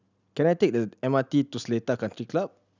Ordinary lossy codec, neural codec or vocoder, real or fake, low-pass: none; none; real; 7.2 kHz